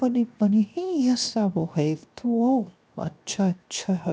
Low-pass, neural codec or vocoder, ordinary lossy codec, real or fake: none; codec, 16 kHz, 0.7 kbps, FocalCodec; none; fake